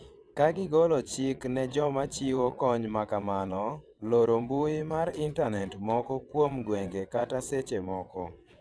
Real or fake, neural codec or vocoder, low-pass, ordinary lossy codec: fake; vocoder, 22.05 kHz, 80 mel bands, WaveNeXt; none; none